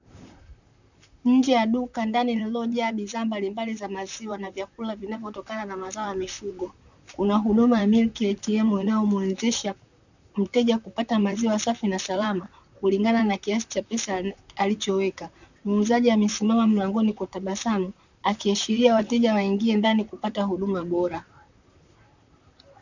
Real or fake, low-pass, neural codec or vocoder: fake; 7.2 kHz; vocoder, 44.1 kHz, 128 mel bands, Pupu-Vocoder